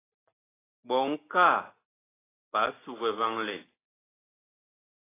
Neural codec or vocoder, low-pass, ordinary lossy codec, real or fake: none; 3.6 kHz; AAC, 16 kbps; real